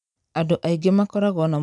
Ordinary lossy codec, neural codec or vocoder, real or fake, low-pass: none; none; real; 10.8 kHz